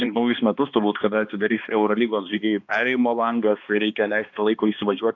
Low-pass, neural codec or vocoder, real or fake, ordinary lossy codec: 7.2 kHz; codec, 16 kHz, 2 kbps, X-Codec, HuBERT features, trained on balanced general audio; fake; AAC, 48 kbps